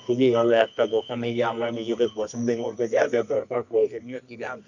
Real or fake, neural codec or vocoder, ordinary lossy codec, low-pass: fake; codec, 24 kHz, 0.9 kbps, WavTokenizer, medium music audio release; none; 7.2 kHz